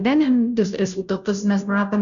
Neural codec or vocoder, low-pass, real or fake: codec, 16 kHz, 0.5 kbps, FunCodec, trained on Chinese and English, 25 frames a second; 7.2 kHz; fake